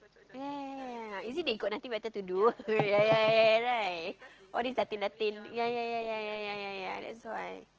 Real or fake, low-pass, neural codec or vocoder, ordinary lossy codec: real; 7.2 kHz; none; Opus, 16 kbps